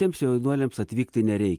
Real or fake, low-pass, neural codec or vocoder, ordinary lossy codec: real; 14.4 kHz; none; Opus, 32 kbps